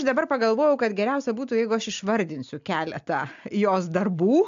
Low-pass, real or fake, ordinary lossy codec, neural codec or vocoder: 7.2 kHz; real; AAC, 64 kbps; none